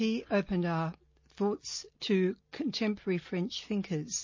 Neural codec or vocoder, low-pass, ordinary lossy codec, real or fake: none; 7.2 kHz; MP3, 32 kbps; real